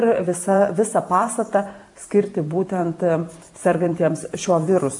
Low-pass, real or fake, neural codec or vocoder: 10.8 kHz; fake; vocoder, 44.1 kHz, 128 mel bands every 512 samples, BigVGAN v2